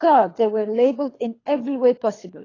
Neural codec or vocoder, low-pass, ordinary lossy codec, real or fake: codec, 24 kHz, 3 kbps, HILCodec; 7.2 kHz; AAC, 32 kbps; fake